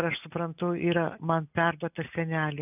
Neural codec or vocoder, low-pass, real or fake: none; 3.6 kHz; real